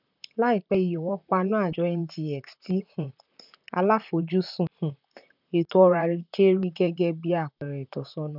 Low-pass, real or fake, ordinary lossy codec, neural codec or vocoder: 5.4 kHz; fake; none; vocoder, 44.1 kHz, 128 mel bands, Pupu-Vocoder